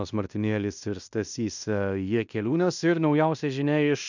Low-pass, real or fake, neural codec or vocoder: 7.2 kHz; fake; codec, 16 kHz in and 24 kHz out, 0.9 kbps, LongCat-Audio-Codec, fine tuned four codebook decoder